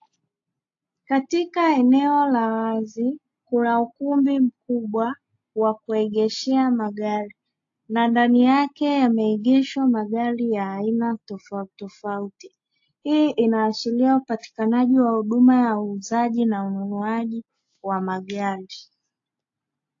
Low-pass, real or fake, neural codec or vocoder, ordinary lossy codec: 7.2 kHz; real; none; AAC, 48 kbps